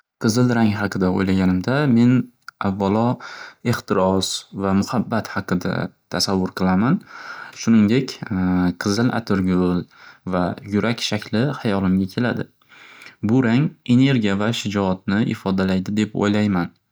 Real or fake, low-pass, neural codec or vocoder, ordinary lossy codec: real; none; none; none